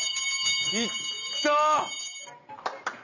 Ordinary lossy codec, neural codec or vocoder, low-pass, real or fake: none; none; 7.2 kHz; real